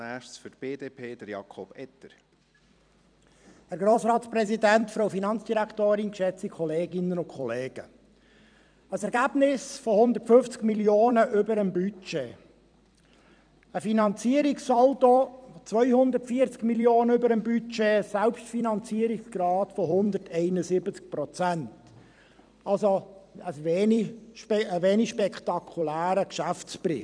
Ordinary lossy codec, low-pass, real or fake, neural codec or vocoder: none; 9.9 kHz; fake; vocoder, 44.1 kHz, 128 mel bands every 256 samples, BigVGAN v2